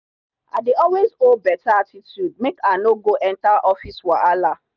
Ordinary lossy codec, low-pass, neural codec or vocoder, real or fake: none; 7.2 kHz; none; real